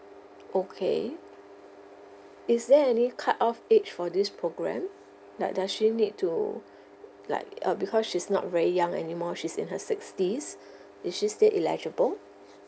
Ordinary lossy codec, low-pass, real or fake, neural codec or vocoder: none; none; real; none